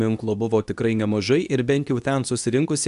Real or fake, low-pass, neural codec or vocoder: fake; 10.8 kHz; codec, 24 kHz, 0.9 kbps, WavTokenizer, medium speech release version 2